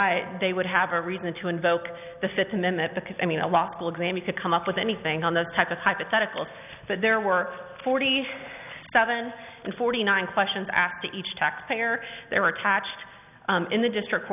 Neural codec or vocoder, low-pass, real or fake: none; 3.6 kHz; real